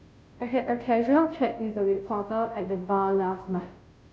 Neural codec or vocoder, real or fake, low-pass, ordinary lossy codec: codec, 16 kHz, 0.5 kbps, FunCodec, trained on Chinese and English, 25 frames a second; fake; none; none